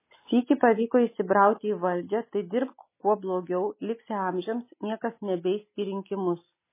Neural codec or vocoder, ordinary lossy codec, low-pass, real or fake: none; MP3, 16 kbps; 3.6 kHz; real